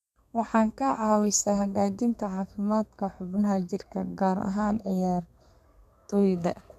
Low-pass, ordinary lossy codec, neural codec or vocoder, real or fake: 14.4 kHz; none; codec, 32 kHz, 1.9 kbps, SNAC; fake